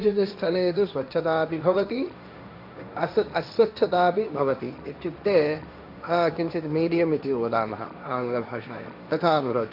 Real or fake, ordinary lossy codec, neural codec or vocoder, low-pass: fake; none; codec, 16 kHz, 1.1 kbps, Voila-Tokenizer; 5.4 kHz